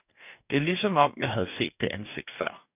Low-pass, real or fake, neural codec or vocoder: 3.6 kHz; fake; codec, 44.1 kHz, 2.6 kbps, DAC